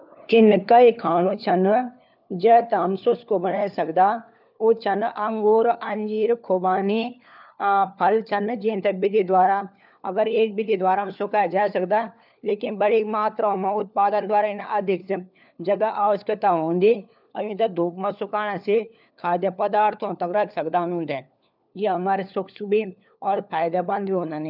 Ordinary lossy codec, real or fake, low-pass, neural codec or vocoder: none; fake; 5.4 kHz; codec, 16 kHz, 4 kbps, FunCodec, trained on LibriTTS, 50 frames a second